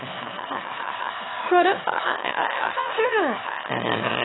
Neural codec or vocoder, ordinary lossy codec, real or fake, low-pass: autoencoder, 22.05 kHz, a latent of 192 numbers a frame, VITS, trained on one speaker; AAC, 16 kbps; fake; 7.2 kHz